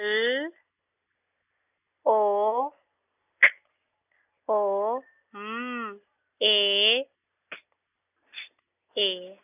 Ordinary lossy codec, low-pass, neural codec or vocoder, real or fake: none; 3.6 kHz; none; real